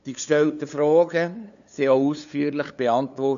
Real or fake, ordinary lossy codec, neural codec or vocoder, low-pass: fake; MP3, 96 kbps; codec, 16 kHz, 2 kbps, FunCodec, trained on LibriTTS, 25 frames a second; 7.2 kHz